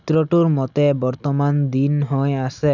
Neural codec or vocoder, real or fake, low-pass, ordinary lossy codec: none; real; 7.2 kHz; none